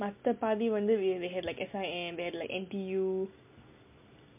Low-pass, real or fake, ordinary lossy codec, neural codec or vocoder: 3.6 kHz; real; MP3, 32 kbps; none